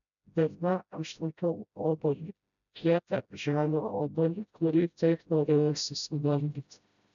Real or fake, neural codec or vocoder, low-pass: fake; codec, 16 kHz, 0.5 kbps, FreqCodec, smaller model; 7.2 kHz